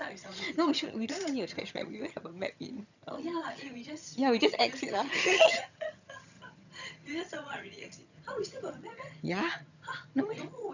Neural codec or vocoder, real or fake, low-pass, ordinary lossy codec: vocoder, 22.05 kHz, 80 mel bands, HiFi-GAN; fake; 7.2 kHz; none